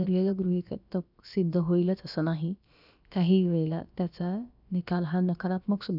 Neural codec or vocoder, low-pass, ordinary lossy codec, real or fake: codec, 16 kHz, about 1 kbps, DyCAST, with the encoder's durations; 5.4 kHz; none; fake